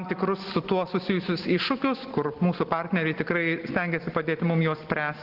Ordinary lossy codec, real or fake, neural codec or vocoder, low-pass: Opus, 16 kbps; real; none; 5.4 kHz